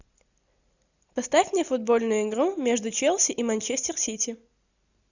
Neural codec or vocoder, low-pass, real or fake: none; 7.2 kHz; real